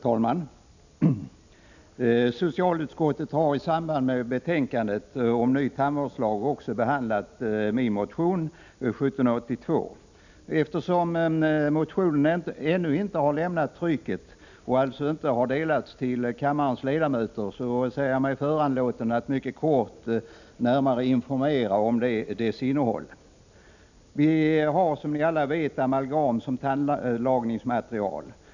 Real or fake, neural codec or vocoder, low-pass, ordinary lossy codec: real; none; 7.2 kHz; none